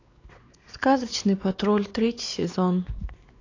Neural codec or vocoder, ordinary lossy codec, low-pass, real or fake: codec, 16 kHz, 4 kbps, X-Codec, WavLM features, trained on Multilingual LibriSpeech; AAC, 32 kbps; 7.2 kHz; fake